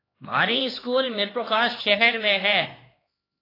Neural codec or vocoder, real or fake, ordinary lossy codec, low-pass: codec, 16 kHz, 2 kbps, X-Codec, HuBERT features, trained on LibriSpeech; fake; AAC, 24 kbps; 5.4 kHz